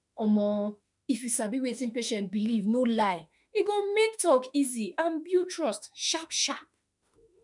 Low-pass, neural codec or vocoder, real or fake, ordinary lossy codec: 10.8 kHz; autoencoder, 48 kHz, 32 numbers a frame, DAC-VAE, trained on Japanese speech; fake; none